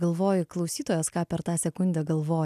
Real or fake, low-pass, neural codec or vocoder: real; 14.4 kHz; none